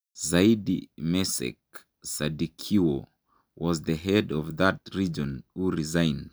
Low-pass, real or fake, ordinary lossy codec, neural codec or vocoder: none; real; none; none